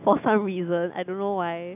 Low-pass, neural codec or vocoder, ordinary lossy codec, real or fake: 3.6 kHz; none; none; real